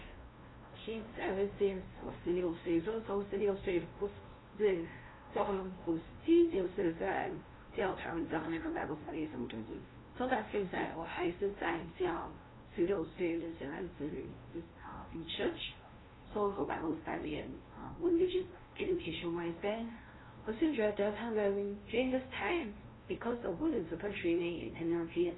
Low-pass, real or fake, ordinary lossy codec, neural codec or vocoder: 7.2 kHz; fake; AAC, 16 kbps; codec, 16 kHz, 0.5 kbps, FunCodec, trained on LibriTTS, 25 frames a second